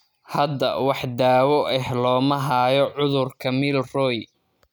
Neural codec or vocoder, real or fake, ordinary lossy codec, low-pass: none; real; none; none